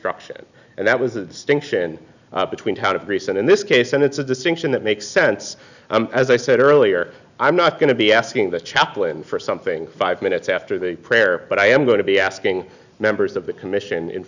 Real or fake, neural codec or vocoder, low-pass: real; none; 7.2 kHz